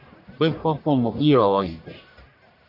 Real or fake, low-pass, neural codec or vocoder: fake; 5.4 kHz; codec, 44.1 kHz, 1.7 kbps, Pupu-Codec